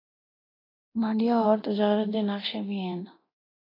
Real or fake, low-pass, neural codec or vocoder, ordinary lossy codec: fake; 5.4 kHz; codec, 24 kHz, 0.9 kbps, DualCodec; AAC, 24 kbps